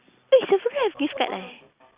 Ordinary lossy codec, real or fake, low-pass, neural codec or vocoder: Opus, 64 kbps; real; 3.6 kHz; none